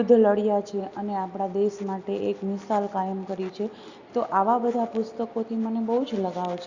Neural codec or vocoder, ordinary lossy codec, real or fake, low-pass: none; Opus, 64 kbps; real; 7.2 kHz